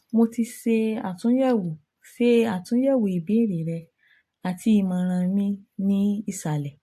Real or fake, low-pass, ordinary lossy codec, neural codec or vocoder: real; 14.4 kHz; AAC, 64 kbps; none